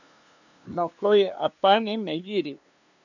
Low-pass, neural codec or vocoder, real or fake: 7.2 kHz; codec, 16 kHz, 2 kbps, FunCodec, trained on LibriTTS, 25 frames a second; fake